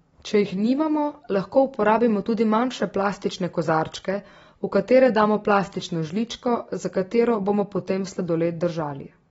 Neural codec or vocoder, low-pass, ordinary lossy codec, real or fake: none; 19.8 kHz; AAC, 24 kbps; real